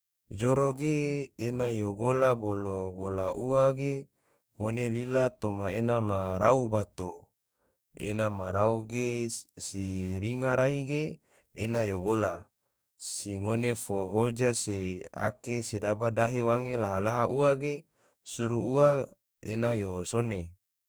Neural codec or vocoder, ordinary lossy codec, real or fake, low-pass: codec, 44.1 kHz, 2.6 kbps, DAC; none; fake; none